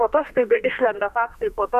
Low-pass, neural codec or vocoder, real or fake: 14.4 kHz; codec, 32 kHz, 1.9 kbps, SNAC; fake